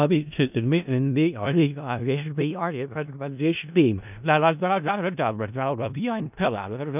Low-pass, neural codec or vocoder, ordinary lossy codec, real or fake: 3.6 kHz; codec, 16 kHz in and 24 kHz out, 0.4 kbps, LongCat-Audio-Codec, four codebook decoder; none; fake